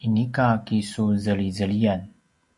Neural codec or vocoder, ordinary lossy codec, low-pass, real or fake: none; AAC, 64 kbps; 10.8 kHz; real